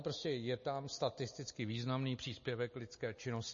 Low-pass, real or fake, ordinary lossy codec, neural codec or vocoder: 7.2 kHz; real; MP3, 32 kbps; none